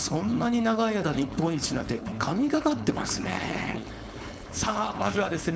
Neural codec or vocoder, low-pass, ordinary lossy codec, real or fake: codec, 16 kHz, 4.8 kbps, FACodec; none; none; fake